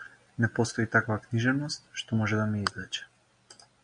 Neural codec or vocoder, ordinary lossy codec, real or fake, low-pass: none; MP3, 96 kbps; real; 9.9 kHz